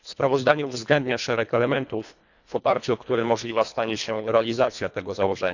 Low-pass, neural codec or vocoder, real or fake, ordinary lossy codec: 7.2 kHz; codec, 24 kHz, 1.5 kbps, HILCodec; fake; none